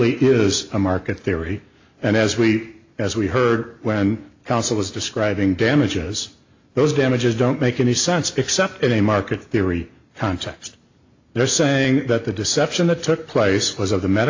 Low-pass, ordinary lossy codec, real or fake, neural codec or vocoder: 7.2 kHz; AAC, 48 kbps; real; none